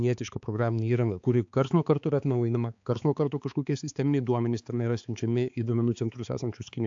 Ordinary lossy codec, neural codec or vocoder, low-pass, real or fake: AAC, 64 kbps; codec, 16 kHz, 4 kbps, X-Codec, HuBERT features, trained on balanced general audio; 7.2 kHz; fake